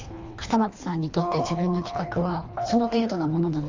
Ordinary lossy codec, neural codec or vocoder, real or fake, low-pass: AAC, 48 kbps; codec, 24 kHz, 3 kbps, HILCodec; fake; 7.2 kHz